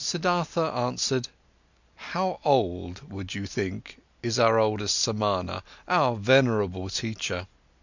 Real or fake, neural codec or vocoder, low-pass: real; none; 7.2 kHz